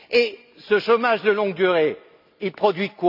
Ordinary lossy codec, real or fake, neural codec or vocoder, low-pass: none; real; none; 5.4 kHz